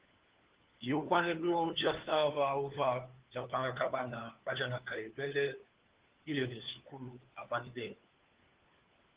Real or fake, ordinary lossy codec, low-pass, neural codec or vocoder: fake; Opus, 16 kbps; 3.6 kHz; codec, 16 kHz, 4 kbps, FunCodec, trained on LibriTTS, 50 frames a second